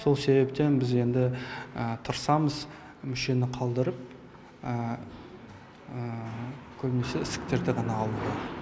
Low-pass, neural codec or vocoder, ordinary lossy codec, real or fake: none; none; none; real